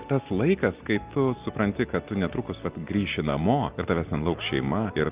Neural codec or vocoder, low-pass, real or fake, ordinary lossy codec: none; 3.6 kHz; real; Opus, 32 kbps